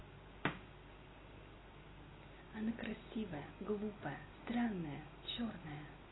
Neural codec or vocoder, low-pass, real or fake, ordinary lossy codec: none; 7.2 kHz; real; AAC, 16 kbps